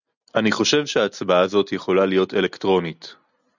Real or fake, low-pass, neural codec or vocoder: real; 7.2 kHz; none